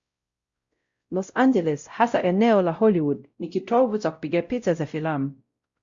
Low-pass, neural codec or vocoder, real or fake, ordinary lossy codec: 7.2 kHz; codec, 16 kHz, 0.5 kbps, X-Codec, WavLM features, trained on Multilingual LibriSpeech; fake; Opus, 64 kbps